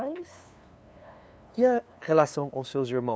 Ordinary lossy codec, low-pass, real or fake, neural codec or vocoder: none; none; fake; codec, 16 kHz, 2 kbps, FunCodec, trained on LibriTTS, 25 frames a second